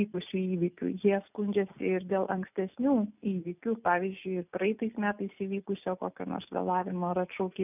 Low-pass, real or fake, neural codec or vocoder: 3.6 kHz; real; none